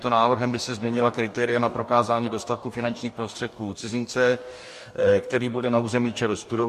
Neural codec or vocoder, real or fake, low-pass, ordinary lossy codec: codec, 44.1 kHz, 2.6 kbps, DAC; fake; 14.4 kHz; MP3, 64 kbps